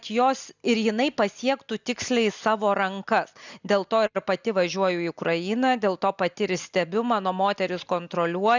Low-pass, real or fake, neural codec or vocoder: 7.2 kHz; real; none